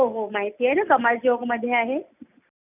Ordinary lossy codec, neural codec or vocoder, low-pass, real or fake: none; none; 3.6 kHz; real